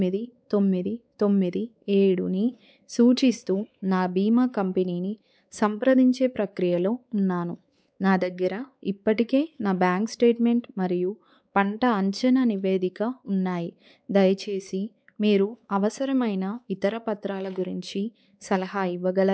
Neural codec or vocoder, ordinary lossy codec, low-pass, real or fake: codec, 16 kHz, 4 kbps, X-Codec, WavLM features, trained on Multilingual LibriSpeech; none; none; fake